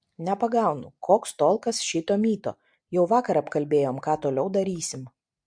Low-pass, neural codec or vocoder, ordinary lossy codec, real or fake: 9.9 kHz; none; MP3, 64 kbps; real